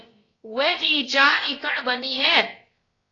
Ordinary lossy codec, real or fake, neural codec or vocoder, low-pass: AAC, 32 kbps; fake; codec, 16 kHz, about 1 kbps, DyCAST, with the encoder's durations; 7.2 kHz